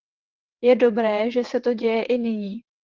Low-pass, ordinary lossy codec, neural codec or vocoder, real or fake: 7.2 kHz; Opus, 16 kbps; vocoder, 22.05 kHz, 80 mel bands, WaveNeXt; fake